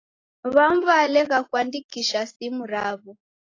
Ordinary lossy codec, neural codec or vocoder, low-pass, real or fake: AAC, 32 kbps; none; 7.2 kHz; real